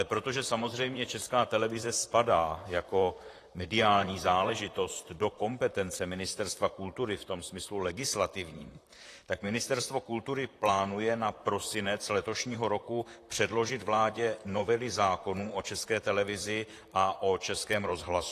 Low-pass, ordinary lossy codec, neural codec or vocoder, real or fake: 14.4 kHz; AAC, 48 kbps; vocoder, 44.1 kHz, 128 mel bands, Pupu-Vocoder; fake